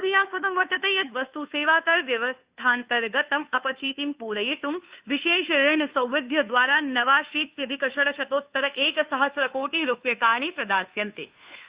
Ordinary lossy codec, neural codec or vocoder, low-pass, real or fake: Opus, 64 kbps; codec, 16 kHz, 2 kbps, FunCodec, trained on Chinese and English, 25 frames a second; 3.6 kHz; fake